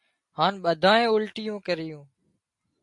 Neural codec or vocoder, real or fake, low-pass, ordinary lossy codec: none; real; 10.8 kHz; MP3, 48 kbps